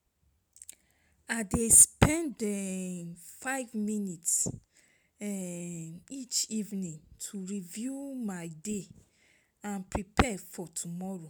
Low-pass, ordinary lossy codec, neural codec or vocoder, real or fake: none; none; none; real